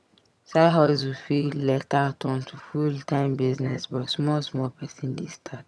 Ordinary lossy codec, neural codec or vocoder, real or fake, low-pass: none; vocoder, 22.05 kHz, 80 mel bands, HiFi-GAN; fake; none